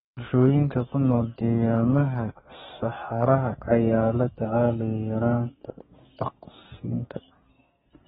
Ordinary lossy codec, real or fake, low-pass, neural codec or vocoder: AAC, 16 kbps; fake; 14.4 kHz; codec, 32 kHz, 1.9 kbps, SNAC